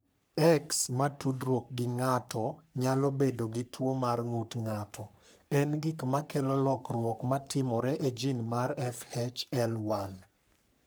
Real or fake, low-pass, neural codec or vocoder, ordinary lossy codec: fake; none; codec, 44.1 kHz, 3.4 kbps, Pupu-Codec; none